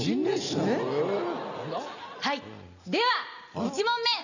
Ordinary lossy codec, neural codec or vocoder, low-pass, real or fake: none; none; 7.2 kHz; real